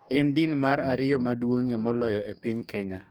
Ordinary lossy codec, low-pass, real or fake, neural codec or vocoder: none; none; fake; codec, 44.1 kHz, 2.6 kbps, DAC